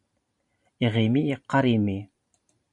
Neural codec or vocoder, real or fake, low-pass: vocoder, 44.1 kHz, 128 mel bands every 512 samples, BigVGAN v2; fake; 10.8 kHz